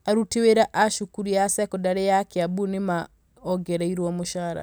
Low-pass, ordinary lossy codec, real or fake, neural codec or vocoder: none; none; real; none